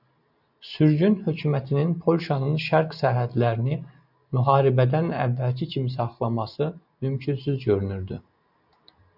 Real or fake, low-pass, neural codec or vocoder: real; 5.4 kHz; none